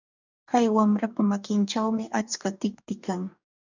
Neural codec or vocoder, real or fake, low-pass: codec, 44.1 kHz, 2.6 kbps, DAC; fake; 7.2 kHz